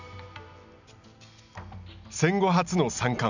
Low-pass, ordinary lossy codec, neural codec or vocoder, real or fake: 7.2 kHz; none; none; real